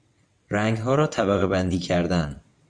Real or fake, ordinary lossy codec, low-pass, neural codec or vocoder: fake; Opus, 64 kbps; 9.9 kHz; vocoder, 22.05 kHz, 80 mel bands, WaveNeXt